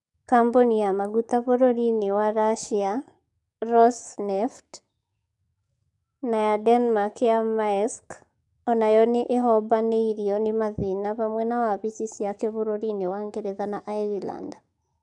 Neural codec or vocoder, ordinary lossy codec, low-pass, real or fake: codec, 44.1 kHz, 7.8 kbps, DAC; none; 10.8 kHz; fake